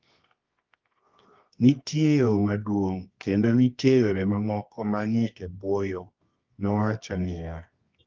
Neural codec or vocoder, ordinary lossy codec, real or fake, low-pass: codec, 24 kHz, 0.9 kbps, WavTokenizer, medium music audio release; Opus, 32 kbps; fake; 7.2 kHz